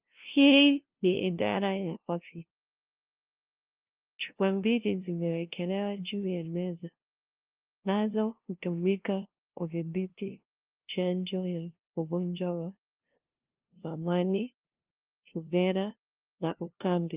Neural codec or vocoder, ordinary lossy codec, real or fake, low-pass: codec, 16 kHz, 0.5 kbps, FunCodec, trained on LibriTTS, 25 frames a second; Opus, 32 kbps; fake; 3.6 kHz